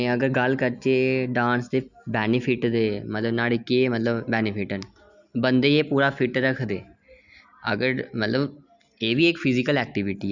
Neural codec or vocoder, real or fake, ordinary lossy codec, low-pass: none; real; none; 7.2 kHz